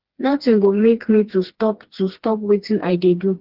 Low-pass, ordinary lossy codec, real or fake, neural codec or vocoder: 5.4 kHz; Opus, 16 kbps; fake; codec, 16 kHz, 2 kbps, FreqCodec, smaller model